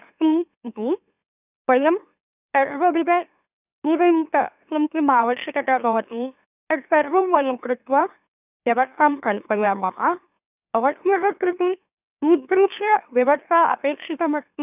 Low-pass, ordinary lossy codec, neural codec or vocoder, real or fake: 3.6 kHz; none; autoencoder, 44.1 kHz, a latent of 192 numbers a frame, MeloTTS; fake